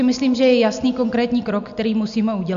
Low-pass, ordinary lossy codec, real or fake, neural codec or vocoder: 7.2 kHz; AAC, 96 kbps; real; none